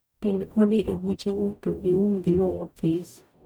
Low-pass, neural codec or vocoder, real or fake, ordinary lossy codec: none; codec, 44.1 kHz, 0.9 kbps, DAC; fake; none